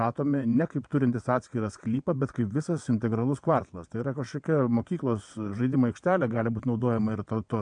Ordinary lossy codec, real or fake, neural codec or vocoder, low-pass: MP3, 64 kbps; fake; vocoder, 22.05 kHz, 80 mel bands, WaveNeXt; 9.9 kHz